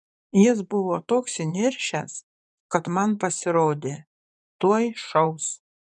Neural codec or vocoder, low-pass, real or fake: vocoder, 24 kHz, 100 mel bands, Vocos; 10.8 kHz; fake